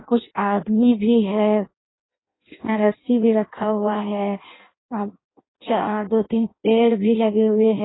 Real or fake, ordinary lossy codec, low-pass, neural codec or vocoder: fake; AAC, 16 kbps; 7.2 kHz; codec, 16 kHz in and 24 kHz out, 0.6 kbps, FireRedTTS-2 codec